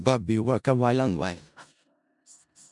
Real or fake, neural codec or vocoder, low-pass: fake; codec, 16 kHz in and 24 kHz out, 0.4 kbps, LongCat-Audio-Codec, four codebook decoder; 10.8 kHz